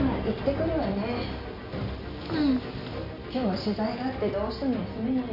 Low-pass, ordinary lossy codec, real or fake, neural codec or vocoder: 5.4 kHz; none; real; none